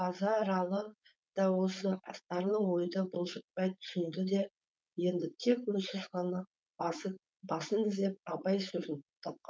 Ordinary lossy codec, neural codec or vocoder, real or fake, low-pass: none; codec, 16 kHz, 4.8 kbps, FACodec; fake; none